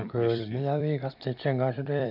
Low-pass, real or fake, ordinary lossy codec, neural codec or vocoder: 5.4 kHz; fake; none; vocoder, 44.1 kHz, 128 mel bands every 256 samples, BigVGAN v2